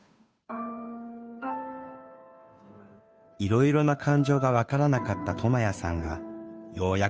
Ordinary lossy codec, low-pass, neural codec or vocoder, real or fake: none; none; codec, 16 kHz, 2 kbps, FunCodec, trained on Chinese and English, 25 frames a second; fake